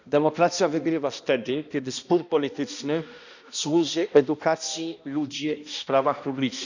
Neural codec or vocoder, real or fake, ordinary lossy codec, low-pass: codec, 16 kHz, 1 kbps, X-Codec, HuBERT features, trained on balanced general audio; fake; none; 7.2 kHz